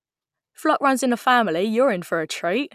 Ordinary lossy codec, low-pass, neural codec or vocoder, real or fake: none; 14.4 kHz; none; real